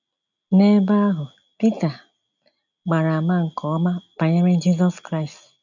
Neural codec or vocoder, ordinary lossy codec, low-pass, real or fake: none; none; 7.2 kHz; real